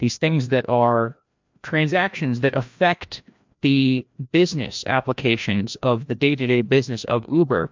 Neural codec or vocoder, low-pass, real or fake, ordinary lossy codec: codec, 16 kHz, 1 kbps, FreqCodec, larger model; 7.2 kHz; fake; MP3, 64 kbps